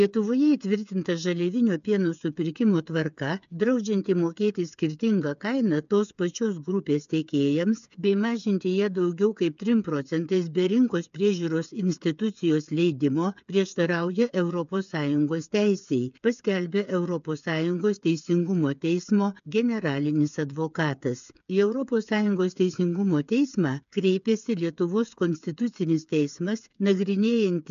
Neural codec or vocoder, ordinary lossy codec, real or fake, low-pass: codec, 16 kHz, 8 kbps, FreqCodec, smaller model; AAC, 96 kbps; fake; 7.2 kHz